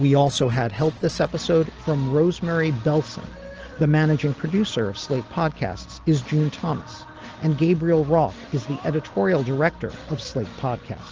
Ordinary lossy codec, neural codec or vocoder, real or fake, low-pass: Opus, 16 kbps; none; real; 7.2 kHz